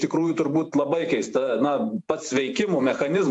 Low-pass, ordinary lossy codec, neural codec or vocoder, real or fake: 10.8 kHz; AAC, 64 kbps; vocoder, 48 kHz, 128 mel bands, Vocos; fake